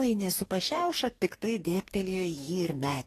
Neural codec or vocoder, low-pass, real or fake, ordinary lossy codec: codec, 44.1 kHz, 2.6 kbps, DAC; 14.4 kHz; fake; AAC, 48 kbps